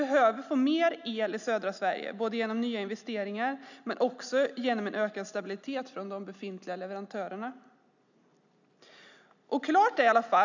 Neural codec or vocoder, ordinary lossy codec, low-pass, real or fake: none; none; 7.2 kHz; real